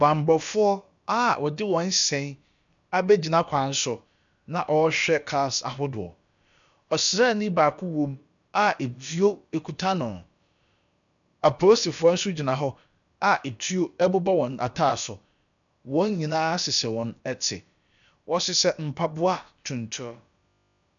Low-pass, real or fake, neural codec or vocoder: 7.2 kHz; fake; codec, 16 kHz, about 1 kbps, DyCAST, with the encoder's durations